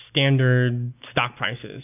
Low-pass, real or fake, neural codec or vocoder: 3.6 kHz; real; none